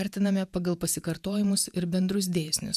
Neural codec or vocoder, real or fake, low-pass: vocoder, 48 kHz, 128 mel bands, Vocos; fake; 14.4 kHz